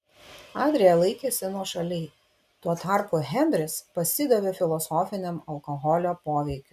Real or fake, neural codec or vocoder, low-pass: real; none; 14.4 kHz